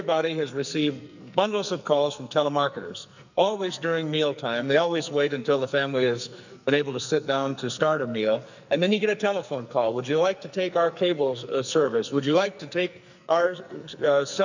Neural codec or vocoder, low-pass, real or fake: codec, 44.1 kHz, 2.6 kbps, SNAC; 7.2 kHz; fake